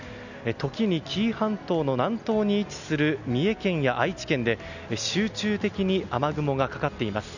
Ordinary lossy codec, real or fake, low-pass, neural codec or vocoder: none; real; 7.2 kHz; none